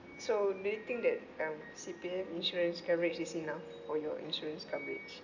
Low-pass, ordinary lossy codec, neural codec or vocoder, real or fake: 7.2 kHz; none; none; real